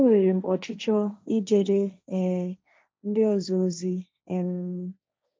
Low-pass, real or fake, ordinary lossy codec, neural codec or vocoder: none; fake; none; codec, 16 kHz, 1.1 kbps, Voila-Tokenizer